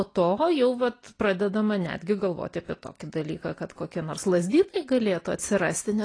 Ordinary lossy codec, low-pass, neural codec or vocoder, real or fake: AAC, 32 kbps; 9.9 kHz; none; real